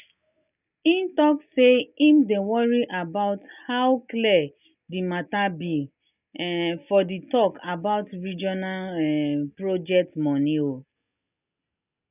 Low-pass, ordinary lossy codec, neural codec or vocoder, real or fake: 3.6 kHz; none; none; real